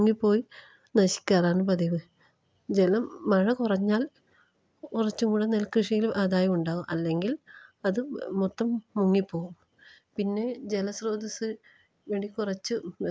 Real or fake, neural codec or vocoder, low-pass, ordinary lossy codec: real; none; none; none